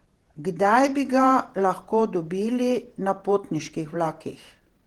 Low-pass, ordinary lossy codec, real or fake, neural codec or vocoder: 19.8 kHz; Opus, 16 kbps; fake; vocoder, 48 kHz, 128 mel bands, Vocos